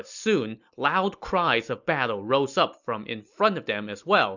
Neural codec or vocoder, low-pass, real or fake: none; 7.2 kHz; real